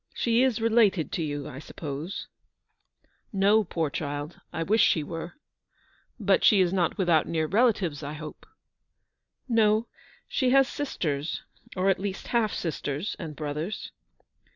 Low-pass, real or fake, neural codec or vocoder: 7.2 kHz; real; none